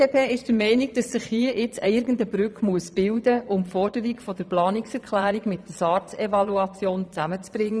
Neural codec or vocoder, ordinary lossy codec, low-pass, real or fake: vocoder, 22.05 kHz, 80 mel bands, Vocos; none; none; fake